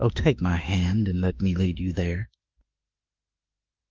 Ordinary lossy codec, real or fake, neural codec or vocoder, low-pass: Opus, 24 kbps; fake; autoencoder, 48 kHz, 32 numbers a frame, DAC-VAE, trained on Japanese speech; 7.2 kHz